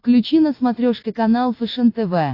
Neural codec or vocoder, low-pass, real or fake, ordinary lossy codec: none; 5.4 kHz; real; AAC, 32 kbps